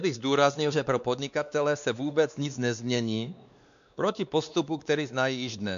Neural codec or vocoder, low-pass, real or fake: codec, 16 kHz, 2 kbps, X-Codec, WavLM features, trained on Multilingual LibriSpeech; 7.2 kHz; fake